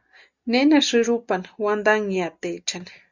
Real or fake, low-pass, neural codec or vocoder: real; 7.2 kHz; none